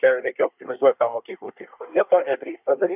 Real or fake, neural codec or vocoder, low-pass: fake; codec, 24 kHz, 1 kbps, SNAC; 3.6 kHz